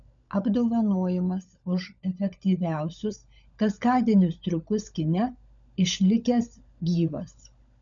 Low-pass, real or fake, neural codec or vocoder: 7.2 kHz; fake; codec, 16 kHz, 16 kbps, FunCodec, trained on LibriTTS, 50 frames a second